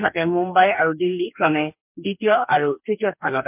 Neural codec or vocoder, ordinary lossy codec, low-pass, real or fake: codec, 44.1 kHz, 2.6 kbps, DAC; MP3, 32 kbps; 3.6 kHz; fake